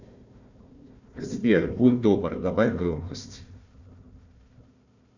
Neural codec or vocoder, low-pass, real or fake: codec, 16 kHz, 1 kbps, FunCodec, trained on Chinese and English, 50 frames a second; 7.2 kHz; fake